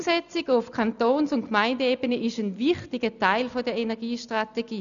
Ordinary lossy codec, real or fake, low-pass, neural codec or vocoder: none; real; 7.2 kHz; none